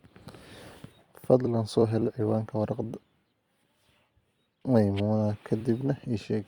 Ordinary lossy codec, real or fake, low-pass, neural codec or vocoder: Opus, 64 kbps; fake; 19.8 kHz; vocoder, 44.1 kHz, 128 mel bands every 256 samples, BigVGAN v2